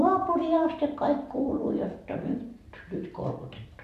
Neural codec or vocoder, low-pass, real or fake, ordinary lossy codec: vocoder, 44.1 kHz, 128 mel bands every 256 samples, BigVGAN v2; 14.4 kHz; fake; AAC, 64 kbps